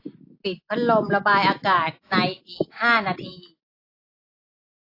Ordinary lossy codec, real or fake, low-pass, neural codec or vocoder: AAC, 32 kbps; real; 5.4 kHz; none